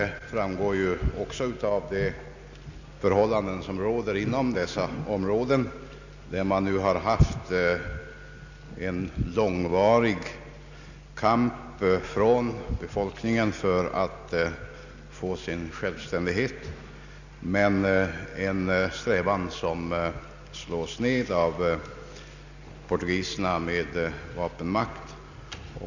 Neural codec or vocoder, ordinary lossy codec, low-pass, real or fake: none; none; 7.2 kHz; real